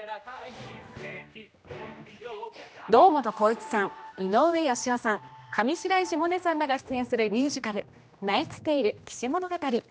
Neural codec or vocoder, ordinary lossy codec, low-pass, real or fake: codec, 16 kHz, 1 kbps, X-Codec, HuBERT features, trained on general audio; none; none; fake